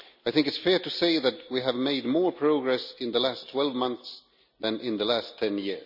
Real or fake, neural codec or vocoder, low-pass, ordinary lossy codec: real; none; 5.4 kHz; none